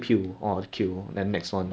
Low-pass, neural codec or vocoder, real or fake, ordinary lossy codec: none; none; real; none